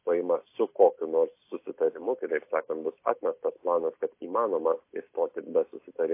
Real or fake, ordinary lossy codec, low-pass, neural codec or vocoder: real; MP3, 24 kbps; 3.6 kHz; none